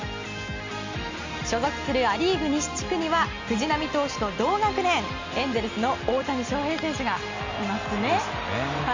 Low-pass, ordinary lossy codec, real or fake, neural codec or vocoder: 7.2 kHz; MP3, 64 kbps; real; none